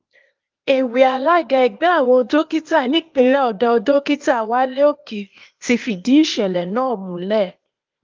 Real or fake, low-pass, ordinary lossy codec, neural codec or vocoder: fake; 7.2 kHz; Opus, 24 kbps; codec, 16 kHz, 0.8 kbps, ZipCodec